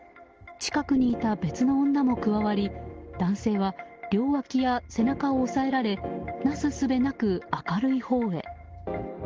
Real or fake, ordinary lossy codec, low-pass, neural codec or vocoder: real; Opus, 16 kbps; 7.2 kHz; none